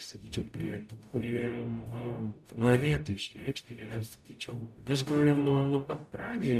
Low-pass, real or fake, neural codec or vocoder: 14.4 kHz; fake; codec, 44.1 kHz, 0.9 kbps, DAC